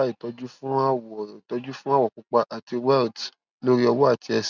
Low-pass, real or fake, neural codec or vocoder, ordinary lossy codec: 7.2 kHz; real; none; none